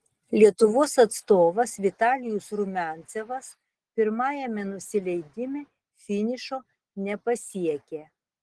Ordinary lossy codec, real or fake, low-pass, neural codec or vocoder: Opus, 16 kbps; real; 10.8 kHz; none